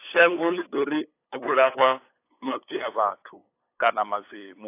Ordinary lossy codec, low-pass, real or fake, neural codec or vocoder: AAC, 24 kbps; 3.6 kHz; fake; codec, 16 kHz, 8 kbps, FunCodec, trained on LibriTTS, 25 frames a second